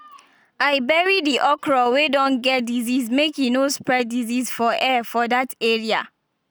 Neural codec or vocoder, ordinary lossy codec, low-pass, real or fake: none; none; none; real